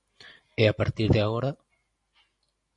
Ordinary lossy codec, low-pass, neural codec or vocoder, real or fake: MP3, 48 kbps; 10.8 kHz; none; real